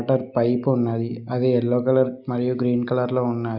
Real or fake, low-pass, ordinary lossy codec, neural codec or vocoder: real; 5.4 kHz; Opus, 64 kbps; none